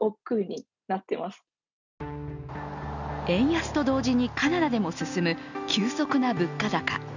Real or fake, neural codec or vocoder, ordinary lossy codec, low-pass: real; none; none; 7.2 kHz